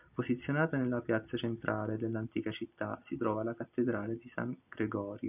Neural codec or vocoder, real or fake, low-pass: none; real; 3.6 kHz